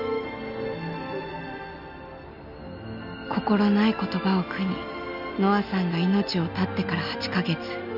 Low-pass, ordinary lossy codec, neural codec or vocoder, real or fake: 5.4 kHz; none; none; real